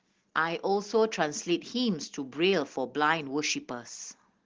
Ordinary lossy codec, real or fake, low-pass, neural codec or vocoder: Opus, 16 kbps; real; 7.2 kHz; none